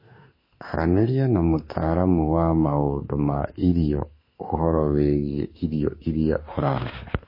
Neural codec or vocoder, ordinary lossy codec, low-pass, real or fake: autoencoder, 48 kHz, 32 numbers a frame, DAC-VAE, trained on Japanese speech; MP3, 24 kbps; 5.4 kHz; fake